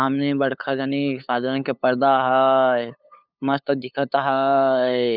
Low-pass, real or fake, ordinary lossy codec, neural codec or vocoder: 5.4 kHz; fake; none; codec, 16 kHz, 8 kbps, FunCodec, trained on LibriTTS, 25 frames a second